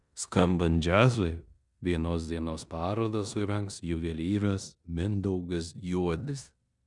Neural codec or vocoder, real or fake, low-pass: codec, 16 kHz in and 24 kHz out, 0.9 kbps, LongCat-Audio-Codec, four codebook decoder; fake; 10.8 kHz